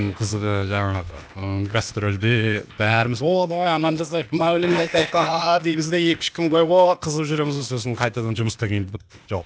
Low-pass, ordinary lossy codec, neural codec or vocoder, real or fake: none; none; codec, 16 kHz, 0.8 kbps, ZipCodec; fake